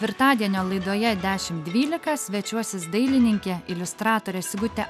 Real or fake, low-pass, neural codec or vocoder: real; 14.4 kHz; none